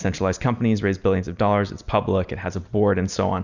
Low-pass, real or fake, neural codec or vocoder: 7.2 kHz; real; none